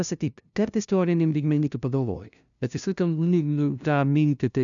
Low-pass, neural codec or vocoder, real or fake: 7.2 kHz; codec, 16 kHz, 0.5 kbps, FunCodec, trained on LibriTTS, 25 frames a second; fake